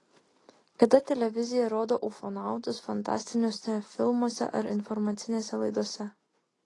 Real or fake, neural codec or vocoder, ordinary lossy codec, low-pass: real; none; AAC, 32 kbps; 10.8 kHz